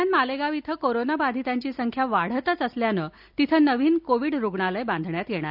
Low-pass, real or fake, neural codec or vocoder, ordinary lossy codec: 5.4 kHz; real; none; none